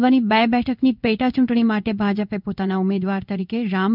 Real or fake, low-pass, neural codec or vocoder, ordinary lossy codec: fake; 5.4 kHz; codec, 16 kHz in and 24 kHz out, 1 kbps, XY-Tokenizer; none